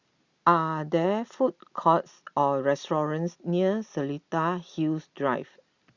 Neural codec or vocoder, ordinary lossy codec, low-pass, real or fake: none; Opus, 64 kbps; 7.2 kHz; real